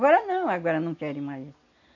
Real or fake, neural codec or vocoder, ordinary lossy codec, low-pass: real; none; none; 7.2 kHz